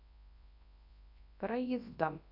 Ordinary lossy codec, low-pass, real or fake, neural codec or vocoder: none; 5.4 kHz; fake; codec, 24 kHz, 0.9 kbps, WavTokenizer, large speech release